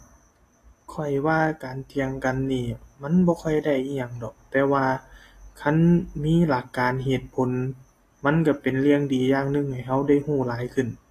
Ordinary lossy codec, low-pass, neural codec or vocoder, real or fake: AAC, 48 kbps; 14.4 kHz; none; real